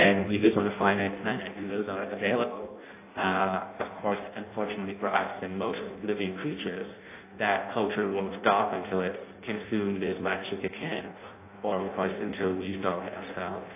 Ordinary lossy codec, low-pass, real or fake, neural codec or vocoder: AAC, 24 kbps; 3.6 kHz; fake; codec, 16 kHz in and 24 kHz out, 0.6 kbps, FireRedTTS-2 codec